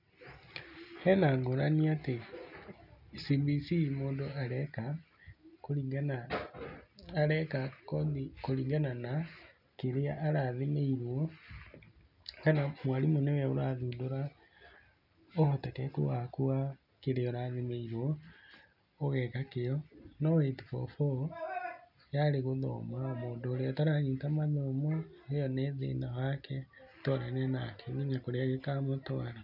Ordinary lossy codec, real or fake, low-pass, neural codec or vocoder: none; real; 5.4 kHz; none